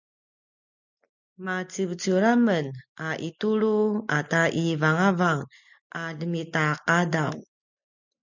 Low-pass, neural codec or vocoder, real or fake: 7.2 kHz; none; real